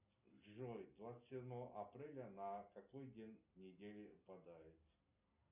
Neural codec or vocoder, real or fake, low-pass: none; real; 3.6 kHz